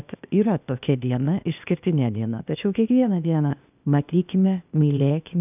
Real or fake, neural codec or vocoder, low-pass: fake; codec, 16 kHz, 0.8 kbps, ZipCodec; 3.6 kHz